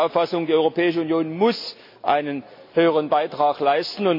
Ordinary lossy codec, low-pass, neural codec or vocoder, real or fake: MP3, 32 kbps; 5.4 kHz; none; real